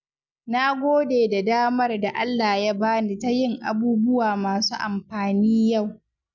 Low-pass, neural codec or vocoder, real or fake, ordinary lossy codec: 7.2 kHz; none; real; none